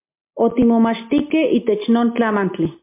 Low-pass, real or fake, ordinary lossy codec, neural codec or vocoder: 3.6 kHz; real; MP3, 24 kbps; none